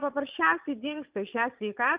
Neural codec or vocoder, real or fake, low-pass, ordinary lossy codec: vocoder, 22.05 kHz, 80 mel bands, Vocos; fake; 3.6 kHz; Opus, 32 kbps